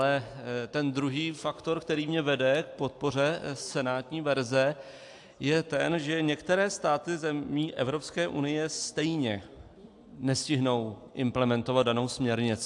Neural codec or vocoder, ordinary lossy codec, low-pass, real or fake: none; AAC, 64 kbps; 10.8 kHz; real